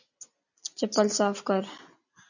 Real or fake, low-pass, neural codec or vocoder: real; 7.2 kHz; none